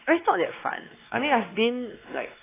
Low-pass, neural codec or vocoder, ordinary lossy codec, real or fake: 3.6 kHz; codec, 16 kHz, 2 kbps, X-Codec, WavLM features, trained on Multilingual LibriSpeech; AAC, 16 kbps; fake